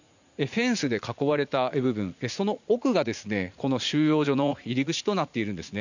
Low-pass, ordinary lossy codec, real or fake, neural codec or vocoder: 7.2 kHz; none; fake; vocoder, 44.1 kHz, 80 mel bands, Vocos